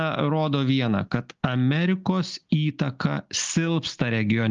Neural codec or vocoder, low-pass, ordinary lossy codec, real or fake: none; 7.2 kHz; Opus, 32 kbps; real